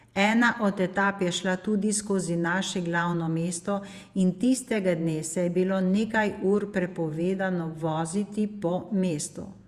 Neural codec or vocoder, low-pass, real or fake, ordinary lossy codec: vocoder, 48 kHz, 128 mel bands, Vocos; 14.4 kHz; fake; Opus, 64 kbps